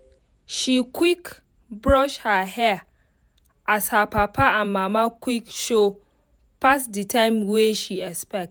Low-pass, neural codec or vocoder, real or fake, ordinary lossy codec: none; vocoder, 48 kHz, 128 mel bands, Vocos; fake; none